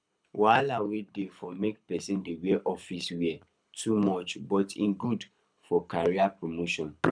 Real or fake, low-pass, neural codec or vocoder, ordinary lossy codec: fake; 9.9 kHz; codec, 24 kHz, 6 kbps, HILCodec; none